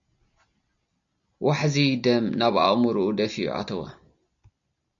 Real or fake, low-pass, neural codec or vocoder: real; 7.2 kHz; none